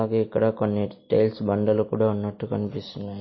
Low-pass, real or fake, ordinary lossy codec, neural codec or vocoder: 7.2 kHz; real; MP3, 24 kbps; none